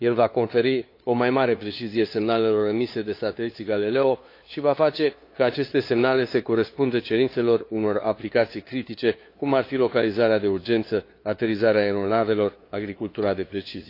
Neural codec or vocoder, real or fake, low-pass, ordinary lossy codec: codec, 16 kHz, 2 kbps, FunCodec, trained on LibriTTS, 25 frames a second; fake; 5.4 kHz; AAC, 32 kbps